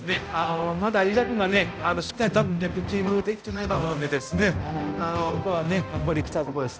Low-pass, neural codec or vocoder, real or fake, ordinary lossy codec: none; codec, 16 kHz, 0.5 kbps, X-Codec, HuBERT features, trained on balanced general audio; fake; none